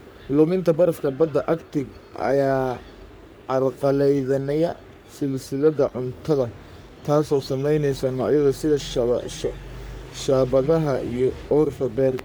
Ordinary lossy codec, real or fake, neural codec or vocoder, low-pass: none; fake; codec, 44.1 kHz, 3.4 kbps, Pupu-Codec; none